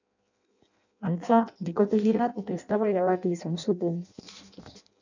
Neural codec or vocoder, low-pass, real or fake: codec, 16 kHz in and 24 kHz out, 0.6 kbps, FireRedTTS-2 codec; 7.2 kHz; fake